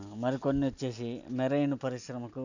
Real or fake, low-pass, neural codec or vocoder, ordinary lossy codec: real; 7.2 kHz; none; none